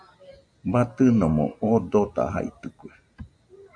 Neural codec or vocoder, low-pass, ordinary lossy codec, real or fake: none; 9.9 kHz; AAC, 64 kbps; real